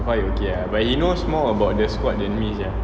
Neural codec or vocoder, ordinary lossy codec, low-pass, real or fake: none; none; none; real